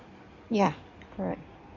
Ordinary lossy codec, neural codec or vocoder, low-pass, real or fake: MP3, 64 kbps; codec, 16 kHz in and 24 kHz out, 2.2 kbps, FireRedTTS-2 codec; 7.2 kHz; fake